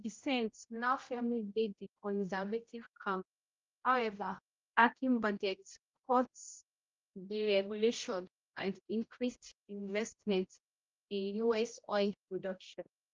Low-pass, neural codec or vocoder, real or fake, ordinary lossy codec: 7.2 kHz; codec, 16 kHz, 0.5 kbps, X-Codec, HuBERT features, trained on general audio; fake; Opus, 32 kbps